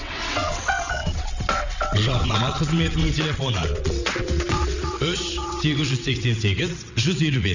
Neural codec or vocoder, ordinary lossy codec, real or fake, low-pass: vocoder, 22.05 kHz, 80 mel bands, WaveNeXt; none; fake; 7.2 kHz